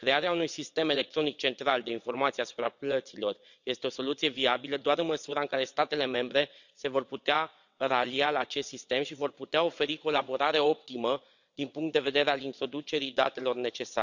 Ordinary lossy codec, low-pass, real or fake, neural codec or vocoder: none; 7.2 kHz; fake; vocoder, 22.05 kHz, 80 mel bands, WaveNeXt